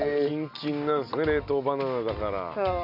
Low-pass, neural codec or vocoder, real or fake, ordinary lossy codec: 5.4 kHz; none; real; none